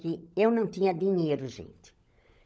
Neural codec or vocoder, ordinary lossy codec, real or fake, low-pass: codec, 16 kHz, 16 kbps, FunCodec, trained on LibriTTS, 50 frames a second; none; fake; none